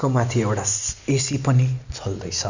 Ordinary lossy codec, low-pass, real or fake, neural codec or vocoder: none; 7.2 kHz; real; none